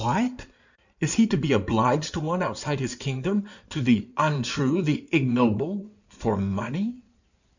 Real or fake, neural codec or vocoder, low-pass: fake; codec, 16 kHz in and 24 kHz out, 2.2 kbps, FireRedTTS-2 codec; 7.2 kHz